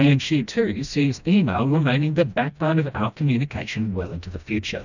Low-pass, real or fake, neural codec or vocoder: 7.2 kHz; fake; codec, 16 kHz, 1 kbps, FreqCodec, smaller model